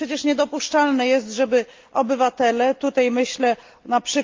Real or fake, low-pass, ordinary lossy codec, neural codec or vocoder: real; 7.2 kHz; Opus, 24 kbps; none